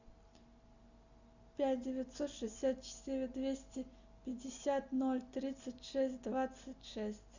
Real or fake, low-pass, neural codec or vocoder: real; 7.2 kHz; none